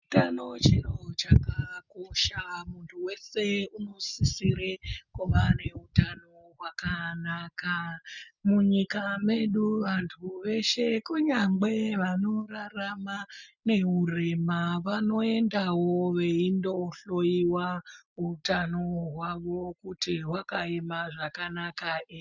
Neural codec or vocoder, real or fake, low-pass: none; real; 7.2 kHz